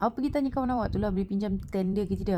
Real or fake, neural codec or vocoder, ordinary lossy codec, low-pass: fake; vocoder, 44.1 kHz, 128 mel bands every 512 samples, BigVGAN v2; Opus, 64 kbps; 19.8 kHz